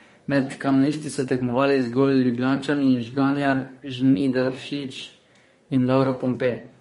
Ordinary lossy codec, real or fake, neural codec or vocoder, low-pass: MP3, 48 kbps; fake; codec, 24 kHz, 1 kbps, SNAC; 10.8 kHz